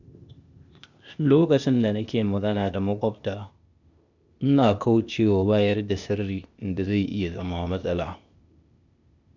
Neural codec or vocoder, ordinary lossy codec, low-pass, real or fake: codec, 16 kHz, 0.8 kbps, ZipCodec; none; 7.2 kHz; fake